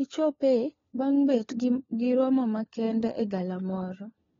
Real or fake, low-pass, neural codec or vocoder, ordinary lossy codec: fake; 7.2 kHz; codec, 16 kHz, 4 kbps, FunCodec, trained on LibriTTS, 50 frames a second; AAC, 24 kbps